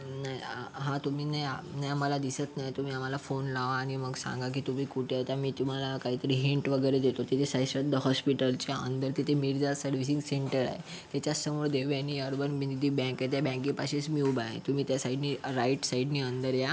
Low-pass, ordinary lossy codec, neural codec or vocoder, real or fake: none; none; none; real